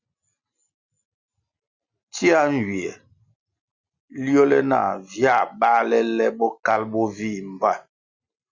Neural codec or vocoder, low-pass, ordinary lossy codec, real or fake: none; 7.2 kHz; Opus, 64 kbps; real